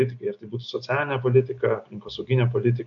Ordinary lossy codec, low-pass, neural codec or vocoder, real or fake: AAC, 64 kbps; 7.2 kHz; none; real